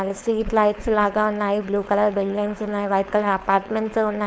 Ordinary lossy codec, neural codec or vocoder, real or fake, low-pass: none; codec, 16 kHz, 4.8 kbps, FACodec; fake; none